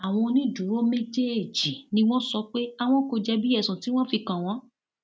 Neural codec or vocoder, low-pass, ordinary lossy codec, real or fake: none; none; none; real